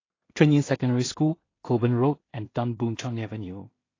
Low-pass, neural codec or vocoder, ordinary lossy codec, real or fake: 7.2 kHz; codec, 16 kHz in and 24 kHz out, 0.4 kbps, LongCat-Audio-Codec, two codebook decoder; AAC, 32 kbps; fake